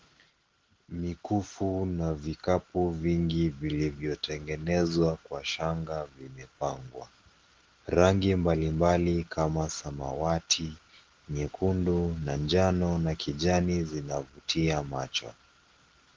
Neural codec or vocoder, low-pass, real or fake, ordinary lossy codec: none; 7.2 kHz; real; Opus, 16 kbps